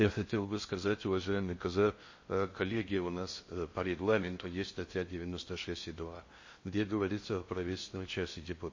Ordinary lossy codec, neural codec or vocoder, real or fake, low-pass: MP3, 32 kbps; codec, 16 kHz in and 24 kHz out, 0.6 kbps, FocalCodec, streaming, 4096 codes; fake; 7.2 kHz